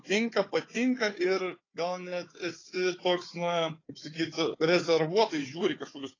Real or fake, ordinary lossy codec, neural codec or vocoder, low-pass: fake; AAC, 32 kbps; codec, 16 kHz, 4 kbps, FunCodec, trained on Chinese and English, 50 frames a second; 7.2 kHz